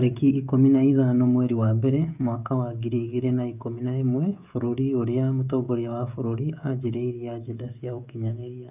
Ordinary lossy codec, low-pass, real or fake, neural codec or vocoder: none; 3.6 kHz; fake; codec, 16 kHz, 16 kbps, FreqCodec, smaller model